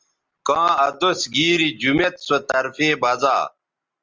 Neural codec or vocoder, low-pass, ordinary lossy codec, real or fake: none; 7.2 kHz; Opus, 32 kbps; real